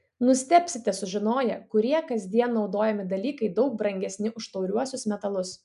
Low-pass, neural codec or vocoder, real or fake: 10.8 kHz; none; real